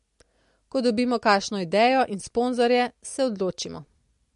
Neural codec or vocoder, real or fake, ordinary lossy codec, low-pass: none; real; MP3, 48 kbps; 10.8 kHz